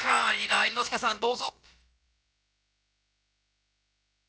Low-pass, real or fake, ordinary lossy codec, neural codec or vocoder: none; fake; none; codec, 16 kHz, about 1 kbps, DyCAST, with the encoder's durations